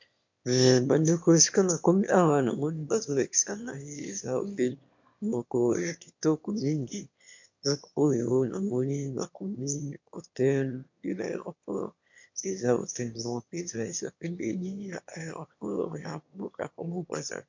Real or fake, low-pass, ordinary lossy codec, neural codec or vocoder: fake; 7.2 kHz; MP3, 48 kbps; autoencoder, 22.05 kHz, a latent of 192 numbers a frame, VITS, trained on one speaker